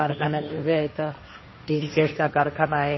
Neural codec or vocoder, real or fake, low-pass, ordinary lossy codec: codec, 16 kHz, 1.1 kbps, Voila-Tokenizer; fake; 7.2 kHz; MP3, 24 kbps